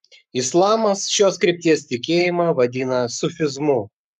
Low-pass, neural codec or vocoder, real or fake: 14.4 kHz; codec, 44.1 kHz, 7.8 kbps, Pupu-Codec; fake